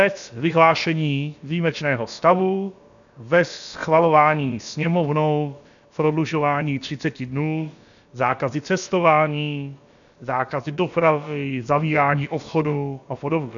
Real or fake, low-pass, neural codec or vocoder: fake; 7.2 kHz; codec, 16 kHz, about 1 kbps, DyCAST, with the encoder's durations